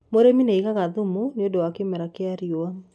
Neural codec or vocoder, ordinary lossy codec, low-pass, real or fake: none; none; none; real